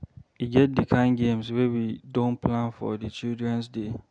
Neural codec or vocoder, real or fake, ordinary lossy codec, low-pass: none; real; none; 9.9 kHz